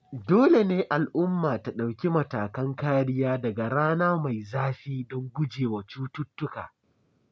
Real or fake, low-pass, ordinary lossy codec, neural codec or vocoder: real; none; none; none